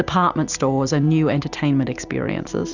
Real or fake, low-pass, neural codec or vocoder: real; 7.2 kHz; none